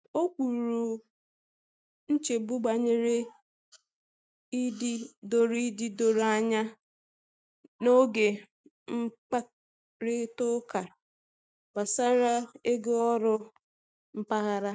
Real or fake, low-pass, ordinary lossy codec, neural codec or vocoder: real; none; none; none